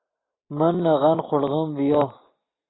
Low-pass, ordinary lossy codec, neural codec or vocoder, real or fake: 7.2 kHz; AAC, 16 kbps; none; real